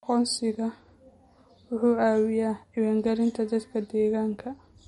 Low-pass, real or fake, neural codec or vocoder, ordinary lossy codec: 19.8 kHz; real; none; MP3, 48 kbps